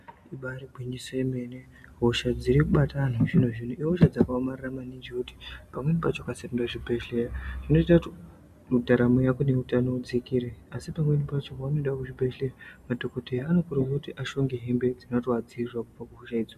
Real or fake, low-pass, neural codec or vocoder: real; 14.4 kHz; none